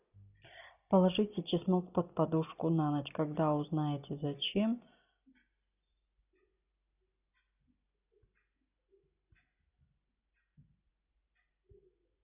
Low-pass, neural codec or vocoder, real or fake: 3.6 kHz; none; real